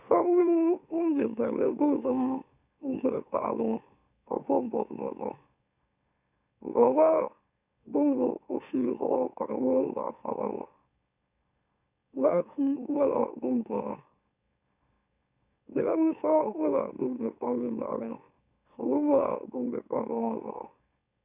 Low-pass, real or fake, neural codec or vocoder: 3.6 kHz; fake; autoencoder, 44.1 kHz, a latent of 192 numbers a frame, MeloTTS